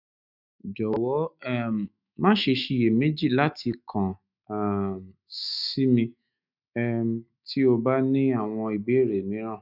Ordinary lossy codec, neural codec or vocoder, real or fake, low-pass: none; autoencoder, 48 kHz, 128 numbers a frame, DAC-VAE, trained on Japanese speech; fake; 5.4 kHz